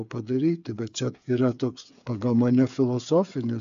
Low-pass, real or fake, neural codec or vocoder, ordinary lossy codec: 7.2 kHz; fake; codec, 16 kHz, 8 kbps, FreqCodec, smaller model; AAC, 96 kbps